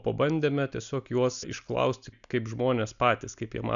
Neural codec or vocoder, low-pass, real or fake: none; 7.2 kHz; real